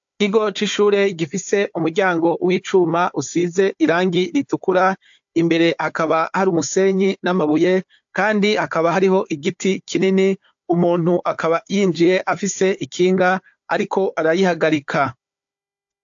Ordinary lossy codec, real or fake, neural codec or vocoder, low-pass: AAC, 64 kbps; fake; codec, 16 kHz, 4 kbps, FunCodec, trained on Chinese and English, 50 frames a second; 7.2 kHz